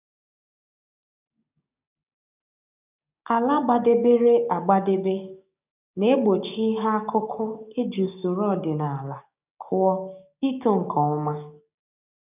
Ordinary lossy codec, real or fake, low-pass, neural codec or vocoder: none; fake; 3.6 kHz; codec, 16 kHz, 6 kbps, DAC